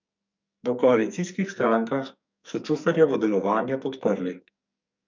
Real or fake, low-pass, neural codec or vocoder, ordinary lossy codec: fake; 7.2 kHz; codec, 44.1 kHz, 2.6 kbps, SNAC; MP3, 64 kbps